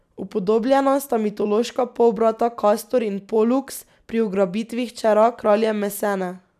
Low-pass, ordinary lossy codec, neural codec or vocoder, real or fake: 14.4 kHz; none; none; real